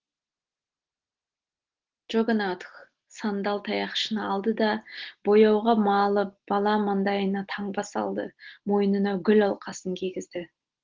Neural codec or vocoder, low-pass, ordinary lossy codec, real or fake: none; 7.2 kHz; Opus, 16 kbps; real